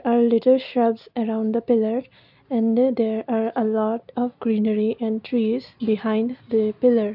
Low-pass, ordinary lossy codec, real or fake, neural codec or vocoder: 5.4 kHz; none; real; none